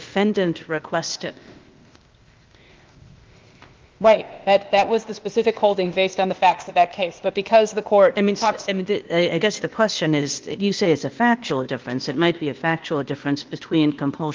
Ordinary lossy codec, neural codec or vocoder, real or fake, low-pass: Opus, 32 kbps; codec, 16 kHz, 0.8 kbps, ZipCodec; fake; 7.2 kHz